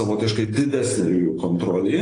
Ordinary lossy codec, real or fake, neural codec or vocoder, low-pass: AAC, 32 kbps; real; none; 9.9 kHz